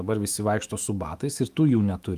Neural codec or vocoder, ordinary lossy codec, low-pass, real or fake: autoencoder, 48 kHz, 128 numbers a frame, DAC-VAE, trained on Japanese speech; Opus, 32 kbps; 14.4 kHz; fake